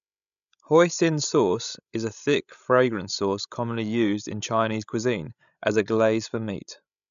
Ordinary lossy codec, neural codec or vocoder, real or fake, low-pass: AAC, 96 kbps; codec, 16 kHz, 16 kbps, FreqCodec, larger model; fake; 7.2 kHz